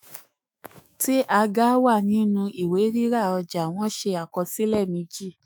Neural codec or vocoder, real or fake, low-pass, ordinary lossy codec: autoencoder, 48 kHz, 128 numbers a frame, DAC-VAE, trained on Japanese speech; fake; none; none